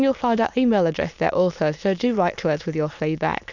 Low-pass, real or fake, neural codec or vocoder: 7.2 kHz; fake; autoencoder, 22.05 kHz, a latent of 192 numbers a frame, VITS, trained on many speakers